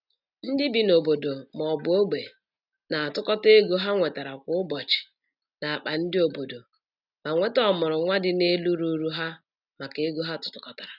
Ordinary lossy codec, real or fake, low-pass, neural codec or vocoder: none; real; 5.4 kHz; none